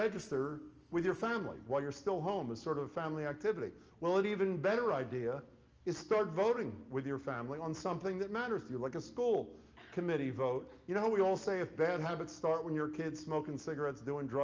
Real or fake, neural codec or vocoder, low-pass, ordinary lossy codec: real; none; 7.2 kHz; Opus, 24 kbps